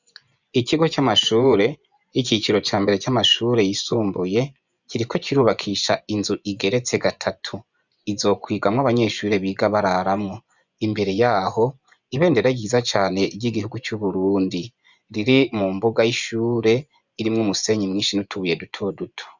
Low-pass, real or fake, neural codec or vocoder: 7.2 kHz; fake; vocoder, 24 kHz, 100 mel bands, Vocos